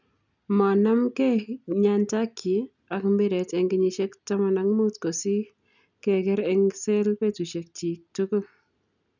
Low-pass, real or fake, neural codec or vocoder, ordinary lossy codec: 7.2 kHz; real; none; none